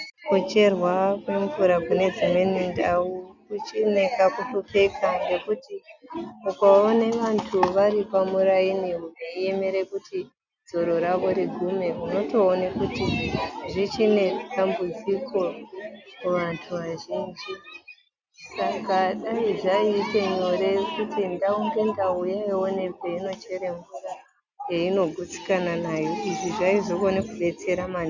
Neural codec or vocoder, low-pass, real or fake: none; 7.2 kHz; real